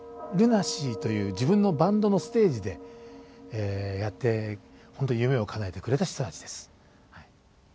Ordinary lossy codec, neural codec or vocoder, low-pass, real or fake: none; none; none; real